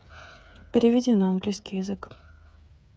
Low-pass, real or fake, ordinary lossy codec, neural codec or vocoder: none; fake; none; codec, 16 kHz, 8 kbps, FreqCodec, smaller model